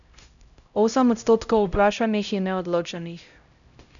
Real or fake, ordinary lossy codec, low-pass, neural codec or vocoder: fake; none; 7.2 kHz; codec, 16 kHz, 0.5 kbps, X-Codec, HuBERT features, trained on LibriSpeech